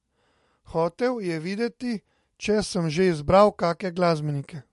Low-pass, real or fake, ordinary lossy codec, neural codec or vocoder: 14.4 kHz; real; MP3, 48 kbps; none